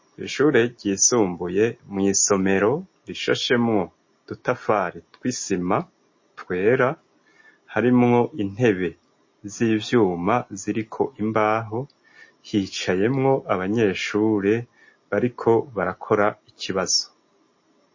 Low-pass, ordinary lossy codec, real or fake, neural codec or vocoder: 7.2 kHz; MP3, 32 kbps; real; none